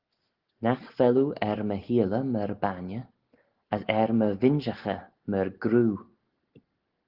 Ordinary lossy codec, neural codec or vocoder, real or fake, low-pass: Opus, 16 kbps; none; real; 5.4 kHz